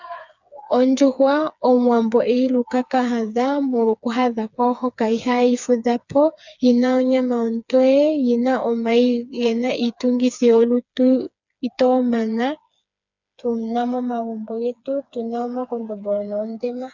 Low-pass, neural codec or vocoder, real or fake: 7.2 kHz; codec, 16 kHz, 4 kbps, FreqCodec, smaller model; fake